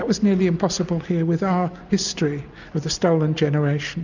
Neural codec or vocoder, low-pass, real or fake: none; 7.2 kHz; real